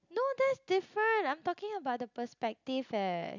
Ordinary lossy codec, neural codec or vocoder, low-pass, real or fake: none; none; 7.2 kHz; real